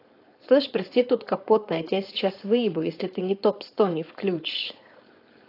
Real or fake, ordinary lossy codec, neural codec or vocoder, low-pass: fake; AAC, 32 kbps; codec, 16 kHz, 4.8 kbps, FACodec; 5.4 kHz